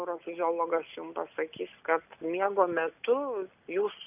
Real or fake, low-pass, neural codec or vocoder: real; 3.6 kHz; none